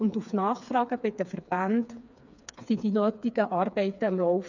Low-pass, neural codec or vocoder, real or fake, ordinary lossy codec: 7.2 kHz; codec, 16 kHz, 4 kbps, FreqCodec, smaller model; fake; none